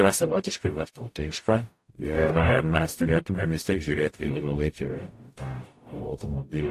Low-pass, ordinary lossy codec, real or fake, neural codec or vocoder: 14.4 kHz; AAC, 64 kbps; fake; codec, 44.1 kHz, 0.9 kbps, DAC